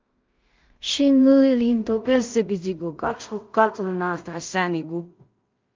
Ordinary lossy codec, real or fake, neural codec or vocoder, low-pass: Opus, 24 kbps; fake; codec, 16 kHz in and 24 kHz out, 0.4 kbps, LongCat-Audio-Codec, two codebook decoder; 7.2 kHz